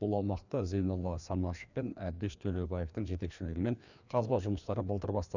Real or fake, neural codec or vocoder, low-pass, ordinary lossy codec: fake; codec, 16 kHz, 2 kbps, FreqCodec, larger model; 7.2 kHz; none